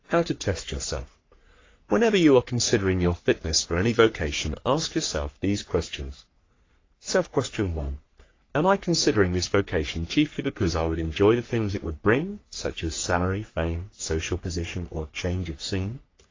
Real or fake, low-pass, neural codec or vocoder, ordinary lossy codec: fake; 7.2 kHz; codec, 44.1 kHz, 3.4 kbps, Pupu-Codec; AAC, 32 kbps